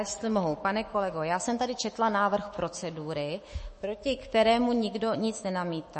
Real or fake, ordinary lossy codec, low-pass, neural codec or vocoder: fake; MP3, 32 kbps; 10.8 kHz; autoencoder, 48 kHz, 128 numbers a frame, DAC-VAE, trained on Japanese speech